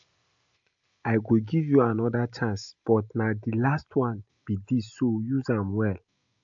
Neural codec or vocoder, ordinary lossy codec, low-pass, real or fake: none; AAC, 64 kbps; 7.2 kHz; real